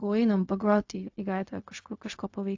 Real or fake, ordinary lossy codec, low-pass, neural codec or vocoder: fake; AAC, 48 kbps; 7.2 kHz; codec, 16 kHz, 0.4 kbps, LongCat-Audio-Codec